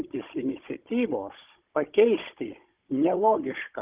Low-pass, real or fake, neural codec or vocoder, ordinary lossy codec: 3.6 kHz; fake; codec, 16 kHz, 16 kbps, FunCodec, trained on Chinese and English, 50 frames a second; Opus, 32 kbps